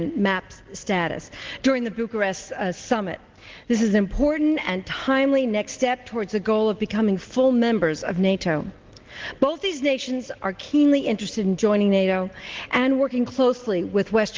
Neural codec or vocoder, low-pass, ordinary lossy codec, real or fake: none; 7.2 kHz; Opus, 16 kbps; real